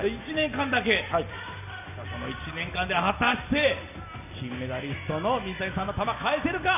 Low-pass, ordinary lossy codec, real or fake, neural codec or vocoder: 3.6 kHz; none; real; none